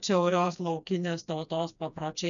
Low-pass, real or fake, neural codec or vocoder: 7.2 kHz; fake; codec, 16 kHz, 2 kbps, FreqCodec, smaller model